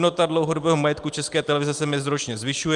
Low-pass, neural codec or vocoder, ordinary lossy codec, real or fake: 10.8 kHz; none; Opus, 32 kbps; real